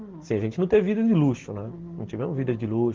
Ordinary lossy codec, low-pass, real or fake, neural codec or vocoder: Opus, 16 kbps; 7.2 kHz; real; none